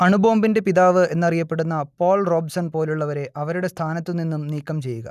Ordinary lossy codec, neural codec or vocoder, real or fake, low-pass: none; none; real; 14.4 kHz